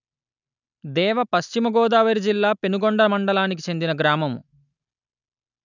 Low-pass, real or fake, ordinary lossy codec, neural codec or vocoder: 7.2 kHz; real; none; none